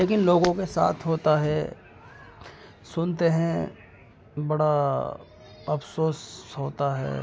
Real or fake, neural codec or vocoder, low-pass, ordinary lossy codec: real; none; none; none